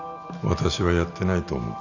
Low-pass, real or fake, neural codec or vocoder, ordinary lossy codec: 7.2 kHz; real; none; none